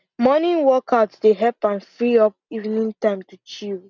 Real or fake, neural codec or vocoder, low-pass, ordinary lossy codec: real; none; 7.2 kHz; none